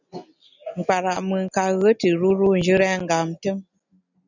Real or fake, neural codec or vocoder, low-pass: real; none; 7.2 kHz